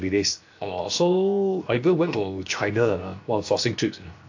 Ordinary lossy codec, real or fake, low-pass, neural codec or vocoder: AAC, 48 kbps; fake; 7.2 kHz; codec, 16 kHz, 0.7 kbps, FocalCodec